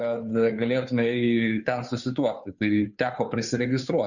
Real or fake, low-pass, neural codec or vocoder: fake; 7.2 kHz; codec, 16 kHz, 2 kbps, FunCodec, trained on Chinese and English, 25 frames a second